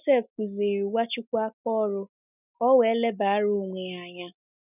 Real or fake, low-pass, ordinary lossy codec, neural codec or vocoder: real; 3.6 kHz; none; none